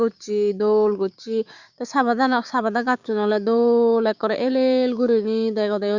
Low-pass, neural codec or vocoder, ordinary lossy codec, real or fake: 7.2 kHz; codec, 16 kHz, 6 kbps, DAC; Opus, 64 kbps; fake